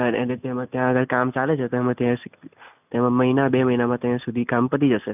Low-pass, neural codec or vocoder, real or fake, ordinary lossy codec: 3.6 kHz; none; real; none